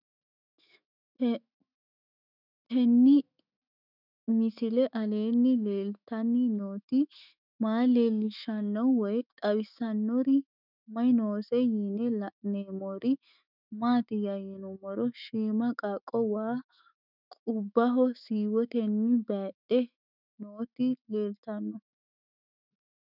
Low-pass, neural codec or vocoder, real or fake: 5.4 kHz; autoencoder, 48 kHz, 128 numbers a frame, DAC-VAE, trained on Japanese speech; fake